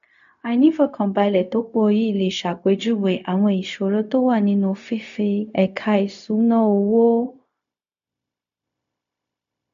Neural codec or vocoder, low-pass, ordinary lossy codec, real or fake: codec, 16 kHz, 0.4 kbps, LongCat-Audio-Codec; 7.2 kHz; AAC, 64 kbps; fake